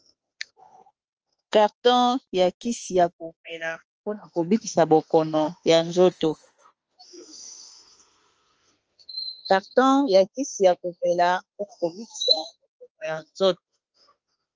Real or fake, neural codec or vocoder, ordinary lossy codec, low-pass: fake; autoencoder, 48 kHz, 32 numbers a frame, DAC-VAE, trained on Japanese speech; Opus, 24 kbps; 7.2 kHz